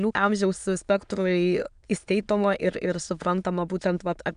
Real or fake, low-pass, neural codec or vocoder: fake; 9.9 kHz; autoencoder, 22.05 kHz, a latent of 192 numbers a frame, VITS, trained on many speakers